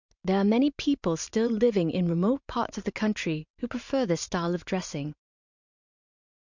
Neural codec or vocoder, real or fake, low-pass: vocoder, 44.1 kHz, 128 mel bands every 512 samples, BigVGAN v2; fake; 7.2 kHz